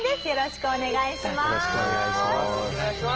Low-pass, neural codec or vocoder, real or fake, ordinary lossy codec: 7.2 kHz; none; real; Opus, 16 kbps